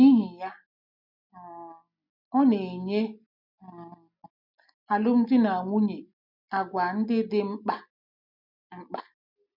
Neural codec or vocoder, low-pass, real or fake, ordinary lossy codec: none; 5.4 kHz; real; none